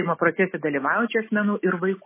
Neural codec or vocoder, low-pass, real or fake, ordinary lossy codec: none; 3.6 kHz; real; MP3, 16 kbps